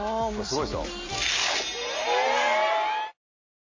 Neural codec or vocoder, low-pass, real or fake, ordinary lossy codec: none; 7.2 kHz; real; MP3, 32 kbps